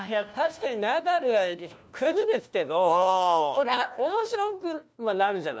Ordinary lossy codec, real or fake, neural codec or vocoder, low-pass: none; fake; codec, 16 kHz, 1 kbps, FunCodec, trained on LibriTTS, 50 frames a second; none